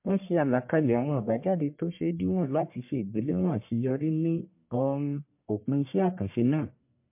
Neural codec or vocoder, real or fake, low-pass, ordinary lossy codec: codec, 44.1 kHz, 1.7 kbps, Pupu-Codec; fake; 3.6 kHz; MP3, 32 kbps